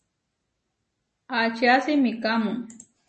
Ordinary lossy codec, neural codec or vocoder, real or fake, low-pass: MP3, 32 kbps; none; real; 10.8 kHz